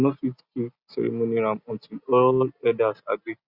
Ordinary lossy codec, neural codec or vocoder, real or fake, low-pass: Opus, 64 kbps; none; real; 5.4 kHz